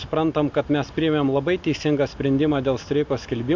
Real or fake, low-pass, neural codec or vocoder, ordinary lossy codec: real; 7.2 kHz; none; MP3, 64 kbps